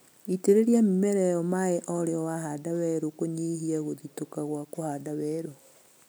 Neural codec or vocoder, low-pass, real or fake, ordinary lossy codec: none; none; real; none